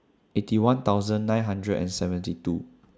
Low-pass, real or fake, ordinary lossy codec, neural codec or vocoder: none; real; none; none